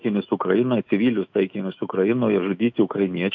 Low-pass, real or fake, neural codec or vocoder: 7.2 kHz; fake; autoencoder, 48 kHz, 128 numbers a frame, DAC-VAE, trained on Japanese speech